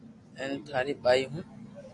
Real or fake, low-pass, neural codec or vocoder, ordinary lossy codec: real; 10.8 kHz; none; AAC, 48 kbps